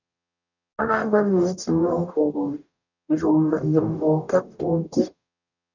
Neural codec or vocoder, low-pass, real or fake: codec, 44.1 kHz, 0.9 kbps, DAC; 7.2 kHz; fake